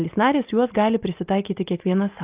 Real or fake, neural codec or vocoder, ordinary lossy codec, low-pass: real; none; Opus, 24 kbps; 3.6 kHz